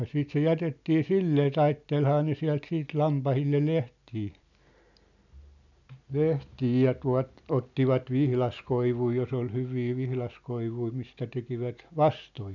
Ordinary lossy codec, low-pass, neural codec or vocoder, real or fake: none; 7.2 kHz; none; real